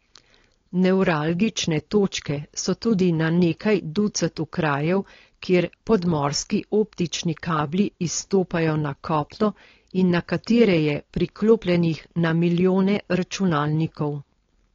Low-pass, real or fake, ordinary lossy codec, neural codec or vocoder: 7.2 kHz; fake; AAC, 32 kbps; codec, 16 kHz, 4.8 kbps, FACodec